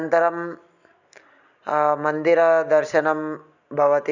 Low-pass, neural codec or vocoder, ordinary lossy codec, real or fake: 7.2 kHz; none; none; real